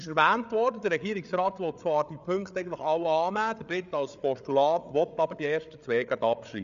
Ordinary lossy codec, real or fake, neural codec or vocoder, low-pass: none; fake; codec, 16 kHz, 8 kbps, FreqCodec, larger model; 7.2 kHz